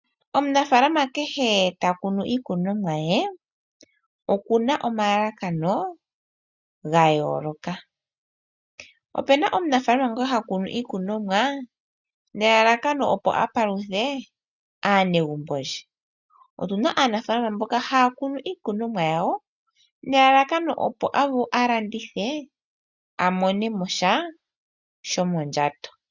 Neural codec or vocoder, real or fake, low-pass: none; real; 7.2 kHz